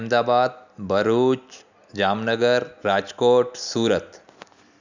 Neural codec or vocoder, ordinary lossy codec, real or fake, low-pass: none; none; real; 7.2 kHz